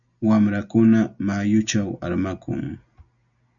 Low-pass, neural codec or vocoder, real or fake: 7.2 kHz; none; real